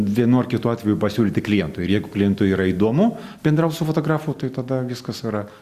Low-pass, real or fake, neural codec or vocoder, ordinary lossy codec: 14.4 kHz; real; none; Opus, 64 kbps